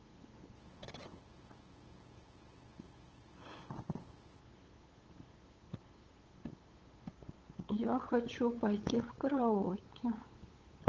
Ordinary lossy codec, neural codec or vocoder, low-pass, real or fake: Opus, 16 kbps; codec, 16 kHz, 8 kbps, FunCodec, trained on LibriTTS, 25 frames a second; 7.2 kHz; fake